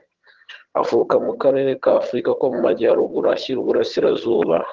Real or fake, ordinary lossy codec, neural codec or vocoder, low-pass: fake; Opus, 16 kbps; vocoder, 22.05 kHz, 80 mel bands, HiFi-GAN; 7.2 kHz